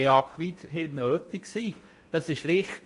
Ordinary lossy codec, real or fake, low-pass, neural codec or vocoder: MP3, 48 kbps; fake; 10.8 kHz; codec, 16 kHz in and 24 kHz out, 0.8 kbps, FocalCodec, streaming, 65536 codes